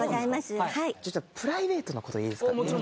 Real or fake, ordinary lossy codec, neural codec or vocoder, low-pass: real; none; none; none